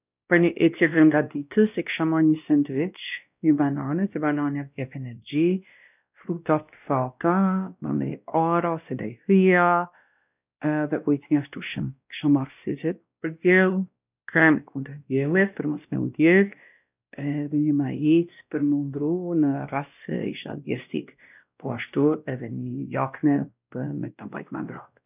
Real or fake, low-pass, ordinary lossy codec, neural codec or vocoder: fake; 3.6 kHz; none; codec, 16 kHz, 1 kbps, X-Codec, WavLM features, trained on Multilingual LibriSpeech